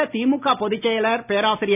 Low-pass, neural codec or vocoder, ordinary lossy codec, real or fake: 3.6 kHz; none; none; real